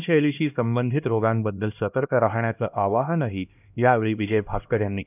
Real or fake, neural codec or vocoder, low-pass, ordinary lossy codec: fake; codec, 16 kHz, 1 kbps, X-Codec, HuBERT features, trained on LibriSpeech; 3.6 kHz; none